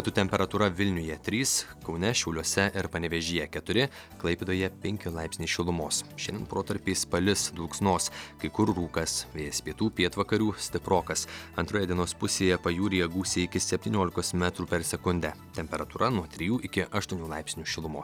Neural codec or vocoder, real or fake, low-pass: none; real; 19.8 kHz